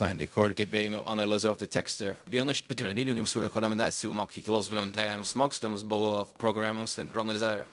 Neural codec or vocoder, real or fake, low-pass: codec, 16 kHz in and 24 kHz out, 0.4 kbps, LongCat-Audio-Codec, fine tuned four codebook decoder; fake; 10.8 kHz